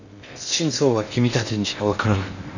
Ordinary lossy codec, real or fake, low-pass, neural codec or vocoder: none; fake; 7.2 kHz; codec, 16 kHz in and 24 kHz out, 0.6 kbps, FocalCodec, streaming, 2048 codes